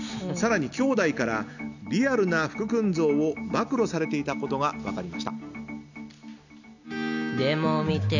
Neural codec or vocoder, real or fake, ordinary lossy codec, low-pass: none; real; none; 7.2 kHz